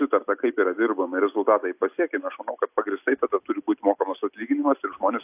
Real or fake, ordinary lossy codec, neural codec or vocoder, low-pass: real; AAC, 32 kbps; none; 3.6 kHz